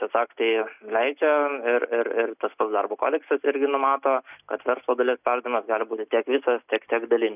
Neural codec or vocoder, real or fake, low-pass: none; real; 3.6 kHz